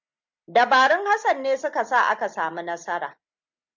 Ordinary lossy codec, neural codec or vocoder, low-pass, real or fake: MP3, 64 kbps; none; 7.2 kHz; real